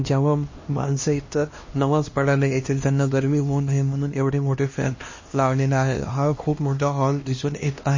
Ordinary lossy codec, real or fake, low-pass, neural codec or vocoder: MP3, 32 kbps; fake; 7.2 kHz; codec, 16 kHz, 1 kbps, X-Codec, HuBERT features, trained on LibriSpeech